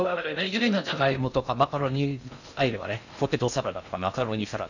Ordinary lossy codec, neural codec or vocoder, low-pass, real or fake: none; codec, 16 kHz in and 24 kHz out, 0.6 kbps, FocalCodec, streaming, 2048 codes; 7.2 kHz; fake